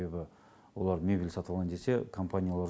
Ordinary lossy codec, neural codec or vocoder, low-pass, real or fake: none; none; none; real